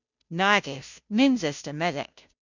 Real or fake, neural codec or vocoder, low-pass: fake; codec, 16 kHz, 0.5 kbps, FunCodec, trained on Chinese and English, 25 frames a second; 7.2 kHz